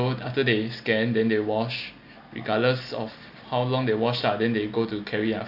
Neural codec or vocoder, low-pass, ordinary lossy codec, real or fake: none; 5.4 kHz; AAC, 48 kbps; real